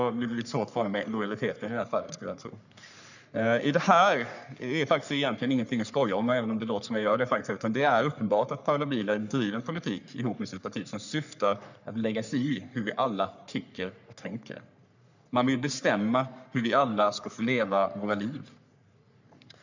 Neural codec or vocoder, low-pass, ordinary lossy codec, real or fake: codec, 44.1 kHz, 3.4 kbps, Pupu-Codec; 7.2 kHz; none; fake